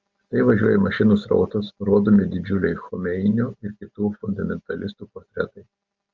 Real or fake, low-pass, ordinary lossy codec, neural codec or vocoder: real; 7.2 kHz; Opus, 24 kbps; none